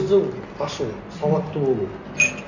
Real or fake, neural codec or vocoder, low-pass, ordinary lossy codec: real; none; 7.2 kHz; none